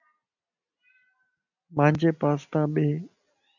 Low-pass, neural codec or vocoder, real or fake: 7.2 kHz; none; real